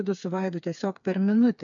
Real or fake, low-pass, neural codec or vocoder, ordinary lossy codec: fake; 7.2 kHz; codec, 16 kHz, 4 kbps, FreqCodec, smaller model; MP3, 96 kbps